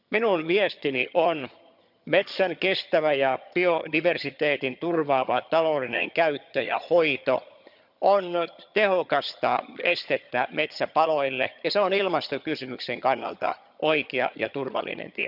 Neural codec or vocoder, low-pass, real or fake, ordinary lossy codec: vocoder, 22.05 kHz, 80 mel bands, HiFi-GAN; 5.4 kHz; fake; none